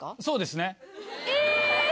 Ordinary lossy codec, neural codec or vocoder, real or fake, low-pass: none; none; real; none